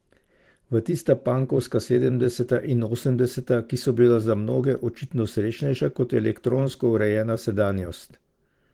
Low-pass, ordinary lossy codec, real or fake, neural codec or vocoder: 19.8 kHz; Opus, 16 kbps; fake; vocoder, 44.1 kHz, 128 mel bands every 512 samples, BigVGAN v2